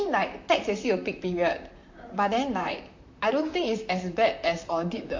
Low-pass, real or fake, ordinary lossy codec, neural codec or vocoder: 7.2 kHz; fake; MP3, 48 kbps; vocoder, 44.1 kHz, 128 mel bands, Pupu-Vocoder